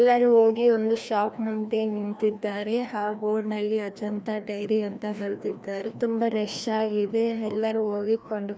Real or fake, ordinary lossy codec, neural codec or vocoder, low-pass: fake; none; codec, 16 kHz, 1 kbps, FreqCodec, larger model; none